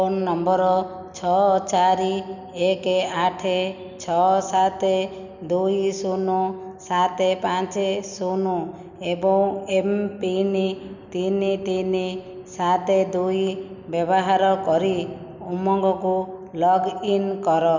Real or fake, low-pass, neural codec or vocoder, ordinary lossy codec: real; 7.2 kHz; none; none